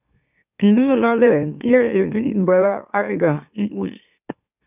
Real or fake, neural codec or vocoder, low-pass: fake; autoencoder, 44.1 kHz, a latent of 192 numbers a frame, MeloTTS; 3.6 kHz